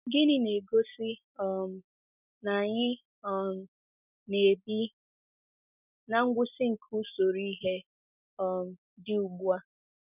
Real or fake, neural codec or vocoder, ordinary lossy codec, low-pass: real; none; none; 3.6 kHz